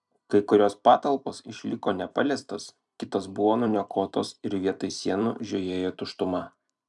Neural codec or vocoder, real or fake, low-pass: vocoder, 44.1 kHz, 128 mel bands every 256 samples, BigVGAN v2; fake; 10.8 kHz